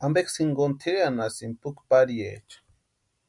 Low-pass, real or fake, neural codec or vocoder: 10.8 kHz; real; none